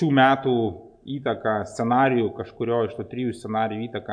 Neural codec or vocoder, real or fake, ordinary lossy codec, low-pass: none; real; AAC, 64 kbps; 9.9 kHz